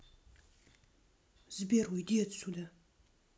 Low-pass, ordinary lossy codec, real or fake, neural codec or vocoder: none; none; real; none